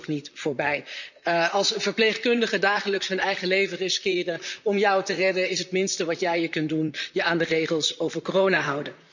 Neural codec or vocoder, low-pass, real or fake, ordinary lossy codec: vocoder, 44.1 kHz, 128 mel bands, Pupu-Vocoder; 7.2 kHz; fake; none